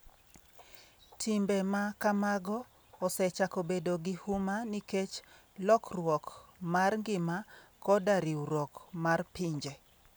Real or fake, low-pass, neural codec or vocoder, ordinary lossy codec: real; none; none; none